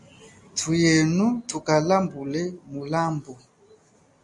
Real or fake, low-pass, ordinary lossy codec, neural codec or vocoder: real; 10.8 kHz; AAC, 64 kbps; none